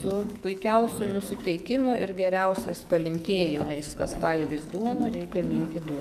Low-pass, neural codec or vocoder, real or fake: 14.4 kHz; codec, 44.1 kHz, 2.6 kbps, SNAC; fake